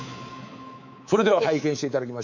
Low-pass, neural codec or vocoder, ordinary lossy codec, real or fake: 7.2 kHz; codec, 24 kHz, 3.1 kbps, DualCodec; none; fake